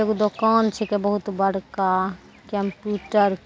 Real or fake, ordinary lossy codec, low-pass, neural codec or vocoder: real; none; none; none